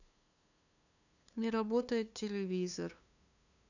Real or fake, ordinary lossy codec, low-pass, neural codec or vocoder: fake; none; 7.2 kHz; codec, 16 kHz, 2 kbps, FunCodec, trained on LibriTTS, 25 frames a second